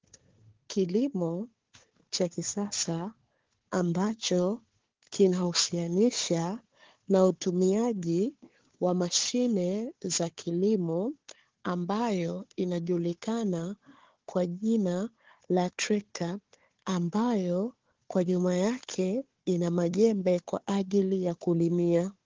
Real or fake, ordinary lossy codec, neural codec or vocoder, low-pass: fake; Opus, 16 kbps; codec, 16 kHz, 4 kbps, FunCodec, trained on Chinese and English, 50 frames a second; 7.2 kHz